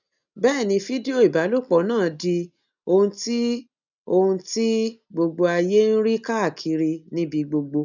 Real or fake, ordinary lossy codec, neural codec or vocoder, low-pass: real; none; none; 7.2 kHz